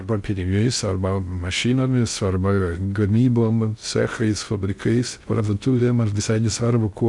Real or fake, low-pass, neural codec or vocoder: fake; 10.8 kHz; codec, 16 kHz in and 24 kHz out, 0.6 kbps, FocalCodec, streaming, 2048 codes